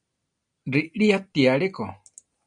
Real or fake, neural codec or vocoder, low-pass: real; none; 9.9 kHz